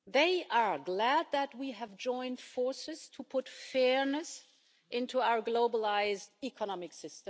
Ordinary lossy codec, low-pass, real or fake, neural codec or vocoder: none; none; real; none